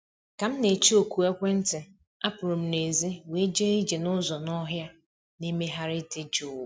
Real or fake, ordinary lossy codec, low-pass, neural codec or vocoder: real; none; none; none